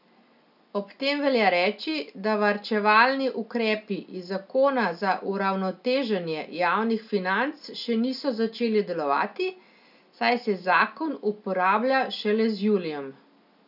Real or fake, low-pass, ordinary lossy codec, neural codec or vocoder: real; 5.4 kHz; none; none